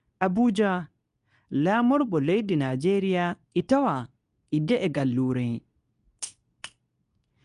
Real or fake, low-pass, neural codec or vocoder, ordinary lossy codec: fake; 10.8 kHz; codec, 24 kHz, 0.9 kbps, WavTokenizer, medium speech release version 2; none